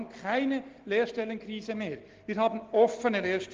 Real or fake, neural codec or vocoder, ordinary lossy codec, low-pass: real; none; Opus, 16 kbps; 7.2 kHz